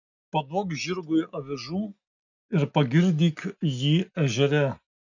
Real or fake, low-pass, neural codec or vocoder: real; 7.2 kHz; none